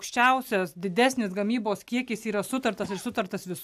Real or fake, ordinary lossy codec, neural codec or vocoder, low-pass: real; AAC, 96 kbps; none; 14.4 kHz